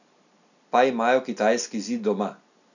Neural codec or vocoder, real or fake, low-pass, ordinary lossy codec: none; real; 7.2 kHz; none